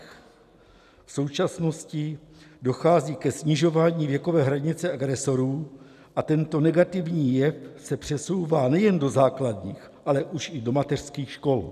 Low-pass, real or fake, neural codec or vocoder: 14.4 kHz; real; none